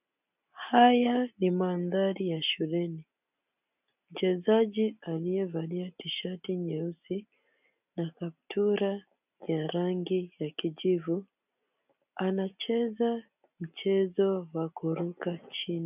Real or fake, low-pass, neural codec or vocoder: real; 3.6 kHz; none